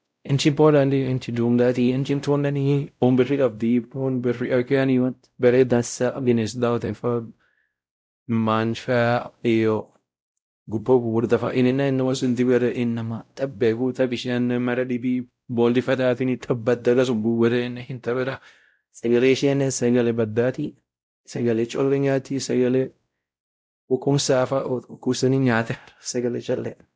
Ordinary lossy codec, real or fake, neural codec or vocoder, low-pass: none; fake; codec, 16 kHz, 0.5 kbps, X-Codec, WavLM features, trained on Multilingual LibriSpeech; none